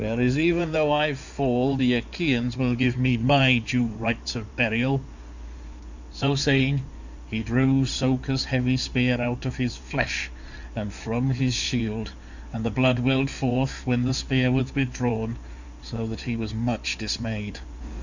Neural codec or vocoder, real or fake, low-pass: codec, 16 kHz in and 24 kHz out, 2.2 kbps, FireRedTTS-2 codec; fake; 7.2 kHz